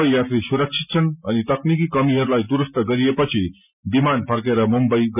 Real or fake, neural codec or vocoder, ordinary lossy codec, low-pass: real; none; none; 3.6 kHz